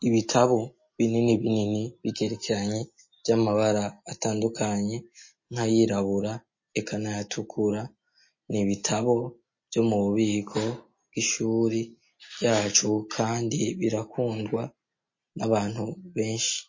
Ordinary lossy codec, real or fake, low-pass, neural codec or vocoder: MP3, 32 kbps; real; 7.2 kHz; none